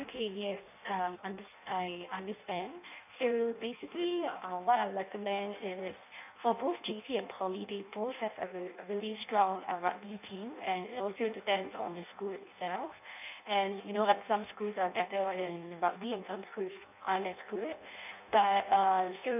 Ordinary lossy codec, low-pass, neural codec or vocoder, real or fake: none; 3.6 kHz; codec, 16 kHz in and 24 kHz out, 0.6 kbps, FireRedTTS-2 codec; fake